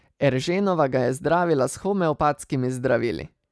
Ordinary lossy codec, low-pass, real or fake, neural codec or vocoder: none; none; real; none